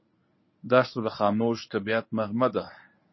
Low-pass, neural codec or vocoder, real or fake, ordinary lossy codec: 7.2 kHz; codec, 24 kHz, 0.9 kbps, WavTokenizer, medium speech release version 1; fake; MP3, 24 kbps